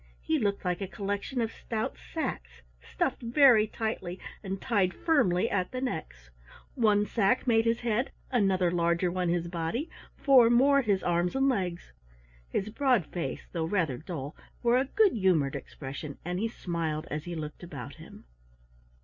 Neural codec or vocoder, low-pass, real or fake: none; 7.2 kHz; real